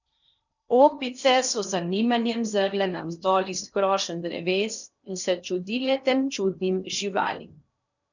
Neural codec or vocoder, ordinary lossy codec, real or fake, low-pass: codec, 16 kHz in and 24 kHz out, 0.8 kbps, FocalCodec, streaming, 65536 codes; none; fake; 7.2 kHz